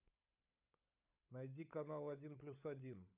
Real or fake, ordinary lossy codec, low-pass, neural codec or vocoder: fake; none; 3.6 kHz; codec, 16 kHz, 16 kbps, FunCodec, trained on LibriTTS, 50 frames a second